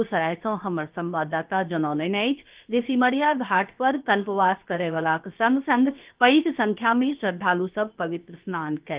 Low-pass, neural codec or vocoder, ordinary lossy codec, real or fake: 3.6 kHz; codec, 16 kHz, 0.7 kbps, FocalCodec; Opus, 32 kbps; fake